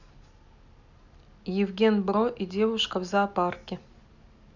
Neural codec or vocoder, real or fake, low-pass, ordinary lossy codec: none; real; 7.2 kHz; none